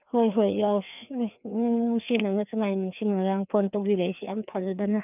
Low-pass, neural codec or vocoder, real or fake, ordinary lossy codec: 3.6 kHz; codec, 16 kHz, 2 kbps, FreqCodec, larger model; fake; none